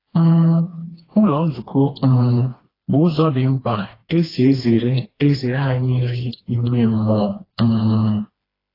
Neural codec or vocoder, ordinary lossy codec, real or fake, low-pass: codec, 16 kHz, 2 kbps, FreqCodec, smaller model; AAC, 24 kbps; fake; 5.4 kHz